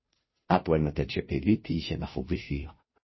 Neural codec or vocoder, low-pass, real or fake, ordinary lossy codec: codec, 16 kHz, 0.5 kbps, FunCodec, trained on Chinese and English, 25 frames a second; 7.2 kHz; fake; MP3, 24 kbps